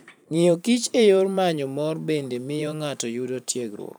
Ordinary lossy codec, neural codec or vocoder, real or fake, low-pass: none; vocoder, 44.1 kHz, 128 mel bands every 512 samples, BigVGAN v2; fake; none